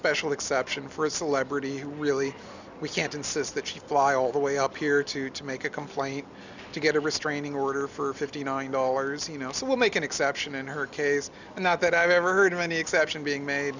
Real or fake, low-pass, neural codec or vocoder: real; 7.2 kHz; none